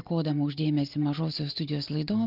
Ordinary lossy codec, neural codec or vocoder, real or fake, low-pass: Opus, 24 kbps; none; real; 5.4 kHz